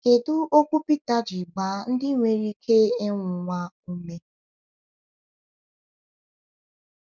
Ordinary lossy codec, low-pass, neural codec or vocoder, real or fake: none; none; none; real